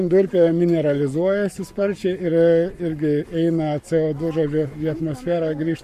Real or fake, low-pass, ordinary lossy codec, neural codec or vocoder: fake; 14.4 kHz; MP3, 64 kbps; codec, 44.1 kHz, 7.8 kbps, Pupu-Codec